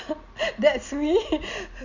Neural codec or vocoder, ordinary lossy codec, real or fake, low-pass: none; Opus, 64 kbps; real; 7.2 kHz